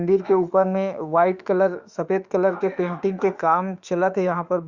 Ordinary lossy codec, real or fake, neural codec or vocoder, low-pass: Opus, 64 kbps; fake; autoencoder, 48 kHz, 32 numbers a frame, DAC-VAE, trained on Japanese speech; 7.2 kHz